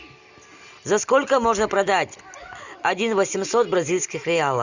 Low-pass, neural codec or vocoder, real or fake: 7.2 kHz; none; real